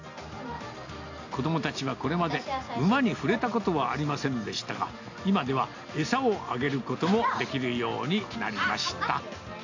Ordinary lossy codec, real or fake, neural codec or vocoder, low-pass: AAC, 48 kbps; real; none; 7.2 kHz